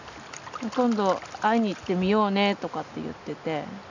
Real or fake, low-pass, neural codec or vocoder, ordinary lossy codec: real; 7.2 kHz; none; none